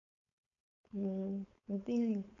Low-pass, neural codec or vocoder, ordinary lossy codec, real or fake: 7.2 kHz; codec, 16 kHz, 4.8 kbps, FACodec; none; fake